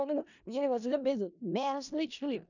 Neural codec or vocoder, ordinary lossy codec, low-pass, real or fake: codec, 16 kHz in and 24 kHz out, 0.4 kbps, LongCat-Audio-Codec, four codebook decoder; none; 7.2 kHz; fake